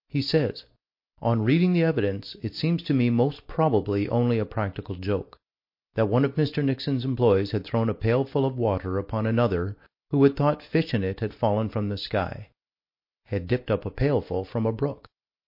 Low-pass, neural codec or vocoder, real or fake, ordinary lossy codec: 5.4 kHz; none; real; MP3, 32 kbps